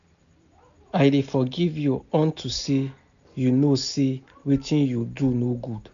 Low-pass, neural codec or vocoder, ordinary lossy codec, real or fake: 7.2 kHz; none; none; real